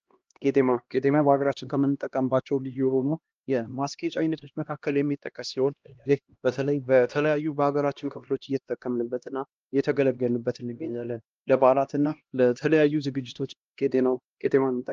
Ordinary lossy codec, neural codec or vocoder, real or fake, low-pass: Opus, 24 kbps; codec, 16 kHz, 1 kbps, X-Codec, HuBERT features, trained on LibriSpeech; fake; 7.2 kHz